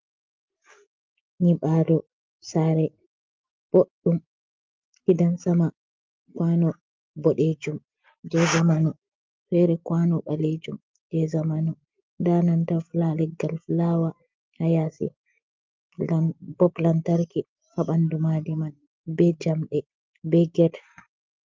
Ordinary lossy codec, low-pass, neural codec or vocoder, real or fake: Opus, 32 kbps; 7.2 kHz; none; real